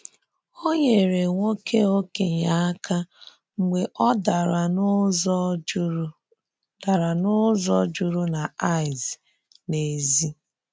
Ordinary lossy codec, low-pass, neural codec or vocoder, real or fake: none; none; none; real